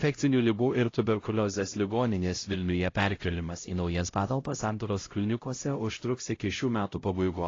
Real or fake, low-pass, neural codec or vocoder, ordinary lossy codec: fake; 7.2 kHz; codec, 16 kHz, 0.5 kbps, X-Codec, HuBERT features, trained on LibriSpeech; AAC, 32 kbps